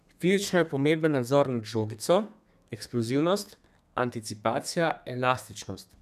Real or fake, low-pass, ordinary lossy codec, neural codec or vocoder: fake; 14.4 kHz; none; codec, 32 kHz, 1.9 kbps, SNAC